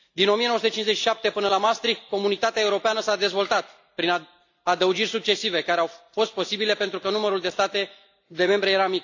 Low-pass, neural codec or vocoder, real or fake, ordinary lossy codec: 7.2 kHz; none; real; AAC, 48 kbps